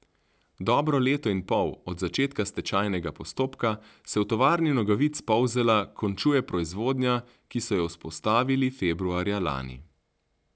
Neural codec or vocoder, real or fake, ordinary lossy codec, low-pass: none; real; none; none